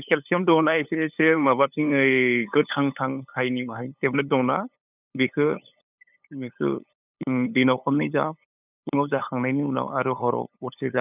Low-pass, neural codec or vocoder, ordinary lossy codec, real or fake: 3.6 kHz; codec, 16 kHz, 8 kbps, FunCodec, trained on LibriTTS, 25 frames a second; none; fake